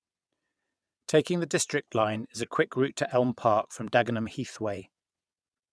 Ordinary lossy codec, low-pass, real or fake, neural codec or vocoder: none; none; fake; vocoder, 22.05 kHz, 80 mel bands, WaveNeXt